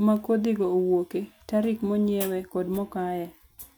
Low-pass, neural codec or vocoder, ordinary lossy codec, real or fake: none; none; none; real